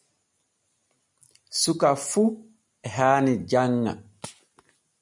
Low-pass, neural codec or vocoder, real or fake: 10.8 kHz; none; real